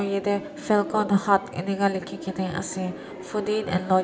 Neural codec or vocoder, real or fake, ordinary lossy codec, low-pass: none; real; none; none